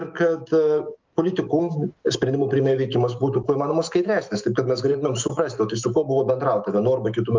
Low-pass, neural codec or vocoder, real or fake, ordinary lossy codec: 7.2 kHz; autoencoder, 48 kHz, 128 numbers a frame, DAC-VAE, trained on Japanese speech; fake; Opus, 32 kbps